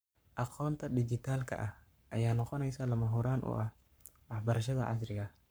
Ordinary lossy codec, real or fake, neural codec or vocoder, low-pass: none; fake; codec, 44.1 kHz, 7.8 kbps, Pupu-Codec; none